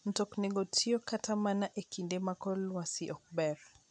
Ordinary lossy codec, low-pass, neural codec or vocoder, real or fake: none; 9.9 kHz; none; real